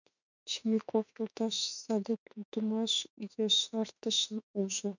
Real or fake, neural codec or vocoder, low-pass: fake; autoencoder, 48 kHz, 32 numbers a frame, DAC-VAE, trained on Japanese speech; 7.2 kHz